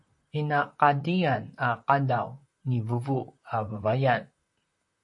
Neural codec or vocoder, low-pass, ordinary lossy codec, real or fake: vocoder, 44.1 kHz, 128 mel bands, Pupu-Vocoder; 10.8 kHz; MP3, 48 kbps; fake